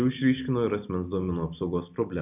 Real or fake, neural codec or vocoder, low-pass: real; none; 3.6 kHz